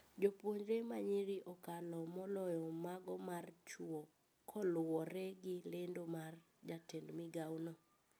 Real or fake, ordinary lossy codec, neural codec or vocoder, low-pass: real; none; none; none